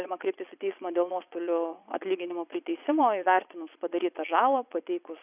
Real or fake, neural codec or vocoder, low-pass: fake; vocoder, 22.05 kHz, 80 mel bands, Vocos; 3.6 kHz